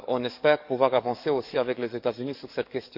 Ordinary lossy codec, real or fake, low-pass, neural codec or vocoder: none; fake; 5.4 kHz; codec, 44.1 kHz, 7.8 kbps, DAC